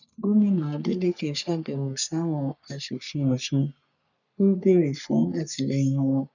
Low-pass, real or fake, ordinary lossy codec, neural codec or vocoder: 7.2 kHz; fake; none; codec, 44.1 kHz, 3.4 kbps, Pupu-Codec